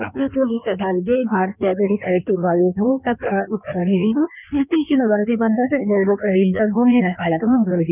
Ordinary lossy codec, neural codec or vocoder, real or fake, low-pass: none; codec, 16 kHz in and 24 kHz out, 1.1 kbps, FireRedTTS-2 codec; fake; 3.6 kHz